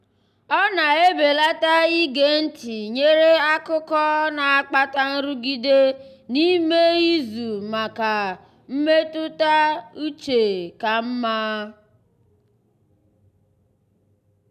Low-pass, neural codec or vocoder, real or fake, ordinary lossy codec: 14.4 kHz; none; real; none